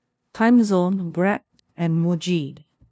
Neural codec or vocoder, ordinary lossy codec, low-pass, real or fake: codec, 16 kHz, 0.5 kbps, FunCodec, trained on LibriTTS, 25 frames a second; none; none; fake